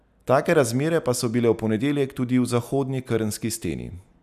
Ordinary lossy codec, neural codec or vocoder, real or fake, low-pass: none; none; real; 14.4 kHz